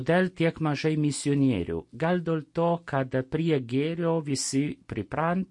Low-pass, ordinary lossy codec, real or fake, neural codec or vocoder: 10.8 kHz; MP3, 48 kbps; real; none